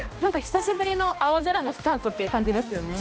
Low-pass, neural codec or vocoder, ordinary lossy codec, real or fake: none; codec, 16 kHz, 1 kbps, X-Codec, HuBERT features, trained on balanced general audio; none; fake